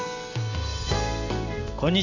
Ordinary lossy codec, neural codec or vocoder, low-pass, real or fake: none; none; 7.2 kHz; real